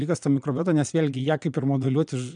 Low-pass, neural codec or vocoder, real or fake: 9.9 kHz; vocoder, 22.05 kHz, 80 mel bands, Vocos; fake